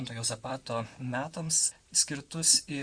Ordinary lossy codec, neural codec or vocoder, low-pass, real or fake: Opus, 64 kbps; vocoder, 44.1 kHz, 128 mel bands, Pupu-Vocoder; 9.9 kHz; fake